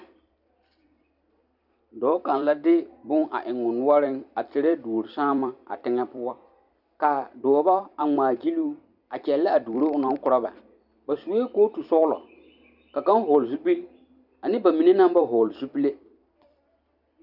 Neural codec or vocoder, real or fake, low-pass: vocoder, 44.1 kHz, 128 mel bands every 256 samples, BigVGAN v2; fake; 5.4 kHz